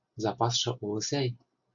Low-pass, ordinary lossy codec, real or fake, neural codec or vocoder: 7.2 kHz; AAC, 64 kbps; real; none